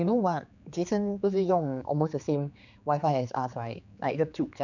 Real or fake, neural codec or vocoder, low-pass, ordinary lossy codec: fake; codec, 16 kHz, 4 kbps, X-Codec, HuBERT features, trained on general audio; 7.2 kHz; none